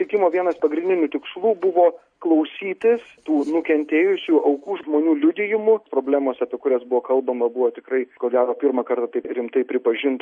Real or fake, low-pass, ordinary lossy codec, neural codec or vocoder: real; 9.9 kHz; MP3, 48 kbps; none